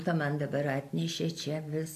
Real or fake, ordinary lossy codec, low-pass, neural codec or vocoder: real; AAC, 64 kbps; 14.4 kHz; none